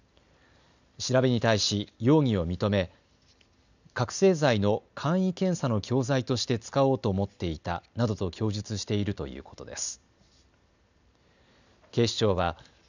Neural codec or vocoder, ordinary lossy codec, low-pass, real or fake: none; none; 7.2 kHz; real